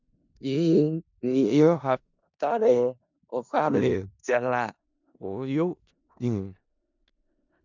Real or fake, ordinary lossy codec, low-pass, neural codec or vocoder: fake; none; 7.2 kHz; codec, 16 kHz in and 24 kHz out, 0.4 kbps, LongCat-Audio-Codec, four codebook decoder